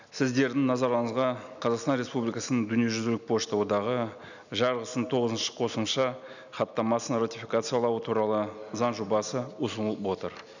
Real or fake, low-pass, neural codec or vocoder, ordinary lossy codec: real; 7.2 kHz; none; none